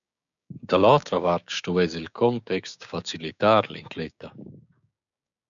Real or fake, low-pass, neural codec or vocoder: fake; 7.2 kHz; codec, 16 kHz, 6 kbps, DAC